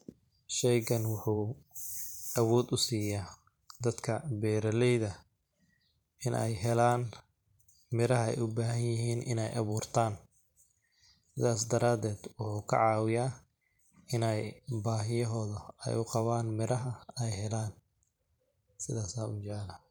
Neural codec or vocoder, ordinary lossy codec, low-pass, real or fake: vocoder, 44.1 kHz, 128 mel bands every 512 samples, BigVGAN v2; none; none; fake